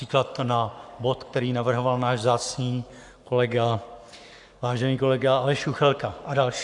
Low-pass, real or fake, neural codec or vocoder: 10.8 kHz; fake; codec, 44.1 kHz, 7.8 kbps, Pupu-Codec